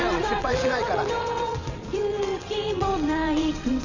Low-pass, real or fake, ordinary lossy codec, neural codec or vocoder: 7.2 kHz; fake; none; vocoder, 22.05 kHz, 80 mel bands, WaveNeXt